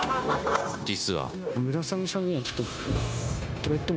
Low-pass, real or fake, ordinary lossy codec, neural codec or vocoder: none; fake; none; codec, 16 kHz, 0.9 kbps, LongCat-Audio-Codec